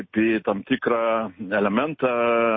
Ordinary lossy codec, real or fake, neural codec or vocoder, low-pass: MP3, 24 kbps; real; none; 7.2 kHz